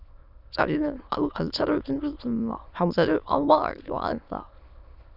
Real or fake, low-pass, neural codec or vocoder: fake; 5.4 kHz; autoencoder, 22.05 kHz, a latent of 192 numbers a frame, VITS, trained on many speakers